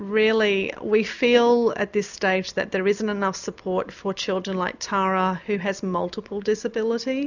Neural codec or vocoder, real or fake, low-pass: none; real; 7.2 kHz